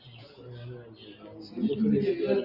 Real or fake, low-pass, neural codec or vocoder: real; 5.4 kHz; none